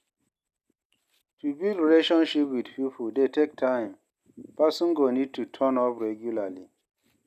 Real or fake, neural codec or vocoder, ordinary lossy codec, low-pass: real; none; none; 14.4 kHz